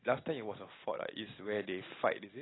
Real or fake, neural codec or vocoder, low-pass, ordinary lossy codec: real; none; 7.2 kHz; AAC, 16 kbps